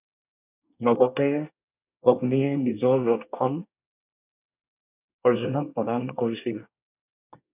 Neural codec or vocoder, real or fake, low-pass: codec, 24 kHz, 1 kbps, SNAC; fake; 3.6 kHz